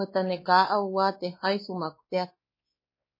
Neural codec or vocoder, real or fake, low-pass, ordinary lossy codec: codec, 16 kHz, 2 kbps, X-Codec, WavLM features, trained on Multilingual LibriSpeech; fake; 5.4 kHz; MP3, 24 kbps